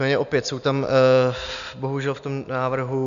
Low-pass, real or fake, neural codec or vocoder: 7.2 kHz; real; none